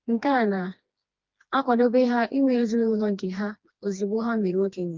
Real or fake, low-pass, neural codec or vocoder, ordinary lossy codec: fake; 7.2 kHz; codec, 16 kHz, 2 kbps, FreqCodec, smaller model; Opus, 24 kbps